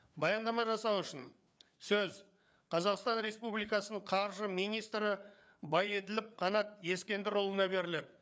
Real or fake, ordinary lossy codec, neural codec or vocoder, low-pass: fake; none; codec, 16 kHz, 4 kbps, FreqCodec, larger model; none